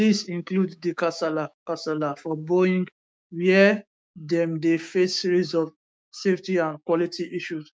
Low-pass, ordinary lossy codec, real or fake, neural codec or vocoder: none; none; fake; codec, 16 kHz, 6 kbps, DAC